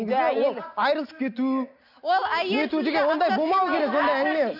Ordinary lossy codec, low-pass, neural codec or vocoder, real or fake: AAC, 48 kbps; 5.4 kHz; none; real